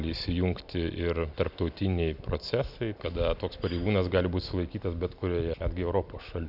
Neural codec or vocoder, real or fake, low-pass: none; real; 5.4 kHz